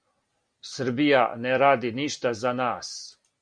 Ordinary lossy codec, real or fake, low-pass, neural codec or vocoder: Opus, 64 kbps; real; 9.9 kHz; none